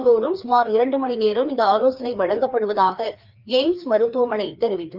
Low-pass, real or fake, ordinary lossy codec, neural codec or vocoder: 5.4 kHz; fake; Opus, 24 kbps; codec, 16 kHz, 2 kbps, FreqCodec, larger model